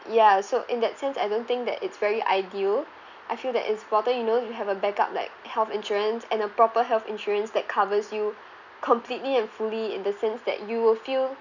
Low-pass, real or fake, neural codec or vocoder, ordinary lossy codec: 7.2 kHz; real; none; none